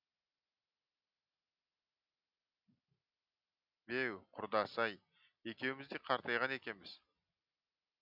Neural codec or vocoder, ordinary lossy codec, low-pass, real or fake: none; none; 5.4 kHz; real